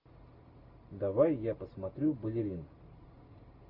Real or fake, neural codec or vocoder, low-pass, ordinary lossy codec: real; none; 5.4 kHz; AAC, 48 kbps